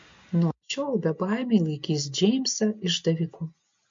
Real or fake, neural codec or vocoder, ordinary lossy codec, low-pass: real; none; MP3, 48 kbps; 7.2 kHz